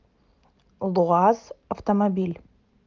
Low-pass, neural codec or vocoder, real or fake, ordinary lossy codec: 7.2 kHz; none; real; Opus, 24 kbps